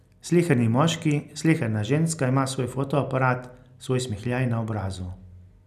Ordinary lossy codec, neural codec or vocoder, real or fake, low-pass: none; none; real; 14.4 kHz